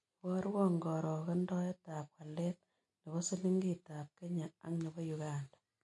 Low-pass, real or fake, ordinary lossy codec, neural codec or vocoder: 10.8 kHz; real; MP3, 48 kbps; none